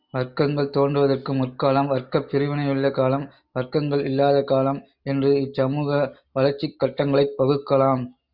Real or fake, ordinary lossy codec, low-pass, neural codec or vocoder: real; Opus, 64 kbps; 5.4 kHz; none